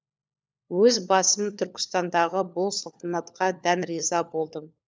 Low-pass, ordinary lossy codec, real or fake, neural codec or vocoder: none; none; fake; codec, 16 kHz, 4 kbps, FunCodec, trained on LibriTTS, 50 frames a second